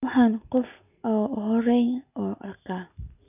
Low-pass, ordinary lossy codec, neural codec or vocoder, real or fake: 3.6 kHz; none; none; real